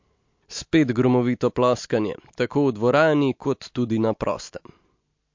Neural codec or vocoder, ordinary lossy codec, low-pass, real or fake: none; MP3, 48 kbps; 7.2 kHz; real